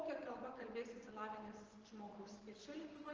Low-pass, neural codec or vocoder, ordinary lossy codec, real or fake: 7.2 kHz; vocoder, 24 kHz, 100 mel bands, Vocos; Opus, 16 kbps; fake